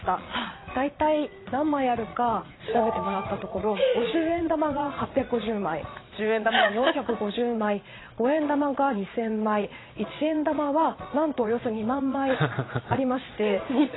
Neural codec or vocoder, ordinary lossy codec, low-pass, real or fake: vocoder, 22.05 kHz, 80 mel bands, Vocos; AAC, 16 kbps; 7.2 kHz; fake